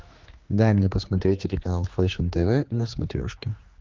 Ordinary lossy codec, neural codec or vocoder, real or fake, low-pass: Opus, 32 kbps; codec, 16 kHz, 2 kbps, X-Codec, HuBERT features, trained on general audio; fake; 7.2 kHz